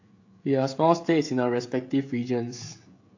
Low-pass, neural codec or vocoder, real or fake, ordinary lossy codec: 7.2 kHz; codec, 16 kHz, 16 kbps, FreqCodec, smaller model; fake; AAC, 48 kbps